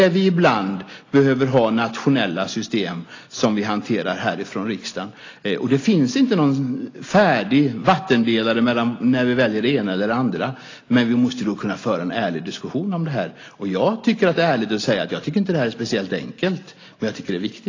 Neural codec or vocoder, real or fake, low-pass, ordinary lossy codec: none; real; 7.2 kHz; AAC, 32 kbps